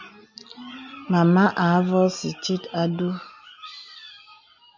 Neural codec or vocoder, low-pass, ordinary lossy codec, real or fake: none; 7.2 kHz; MP3, 64 kbps; real